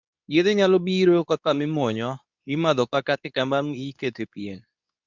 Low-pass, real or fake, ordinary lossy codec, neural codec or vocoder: 7.2 kHz; fake; none; codec, 24 kHz, 0.9 kbps, WavTokenizer, medium speech release version 2